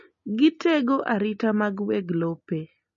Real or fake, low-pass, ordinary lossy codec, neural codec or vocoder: real; 7.2 kHz; MP3, 32 kbps; none